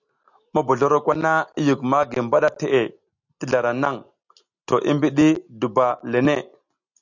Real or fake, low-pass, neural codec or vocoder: real; 7.2 kHz; none